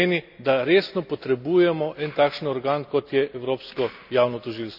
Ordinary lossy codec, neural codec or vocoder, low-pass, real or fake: none; none; 5.4 kHz; real